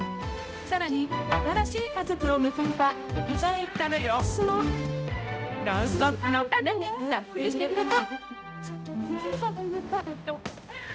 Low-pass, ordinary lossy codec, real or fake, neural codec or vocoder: none; none; fake; codec, 16 kHz, 0.5 kbps, X-Codec, HuBERT features, trained on balanced general audio